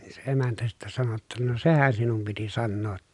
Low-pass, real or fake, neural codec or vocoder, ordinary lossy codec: 10.8 kHz; real; none; none